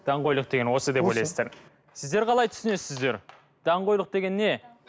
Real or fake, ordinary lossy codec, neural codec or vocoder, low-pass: real; none; none; none